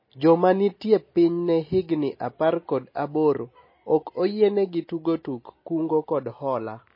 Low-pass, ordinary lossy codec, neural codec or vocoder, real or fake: 5.4 kHz; MP3, 24 kbps; none; real